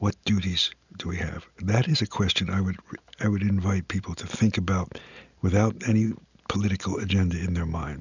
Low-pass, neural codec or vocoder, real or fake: 7.2 kHz; none; real